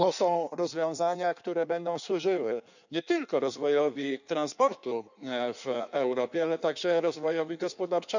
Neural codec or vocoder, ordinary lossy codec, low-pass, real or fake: codec, 16 kHz in and 24 kHz out, 1.1 kbps, FireRedTTS-2 codec; none; 7.2 kHz; fake